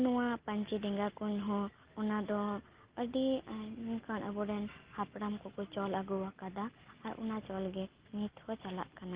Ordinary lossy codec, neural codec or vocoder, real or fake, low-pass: Opus, 16 kbps; none; real; 3.6 kHz